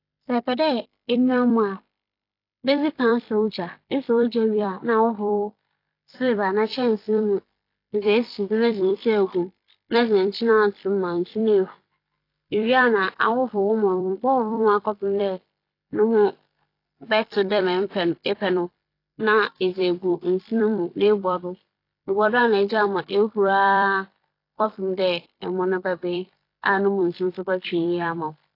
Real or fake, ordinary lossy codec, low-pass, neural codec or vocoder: fake; AAC, 32 kbps; 5.4 kHz; vocoder, 44.1 kHz, 128 mel bands every 256 samples, BigVGAN v2